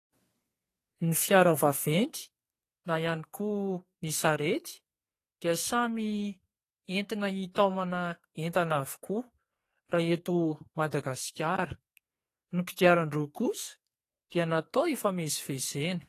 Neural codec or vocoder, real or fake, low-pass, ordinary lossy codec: codec, 44.1 kHz, 2.6 kbps, SNAC; fake; 14.4 kHz; AAC, 48 kbps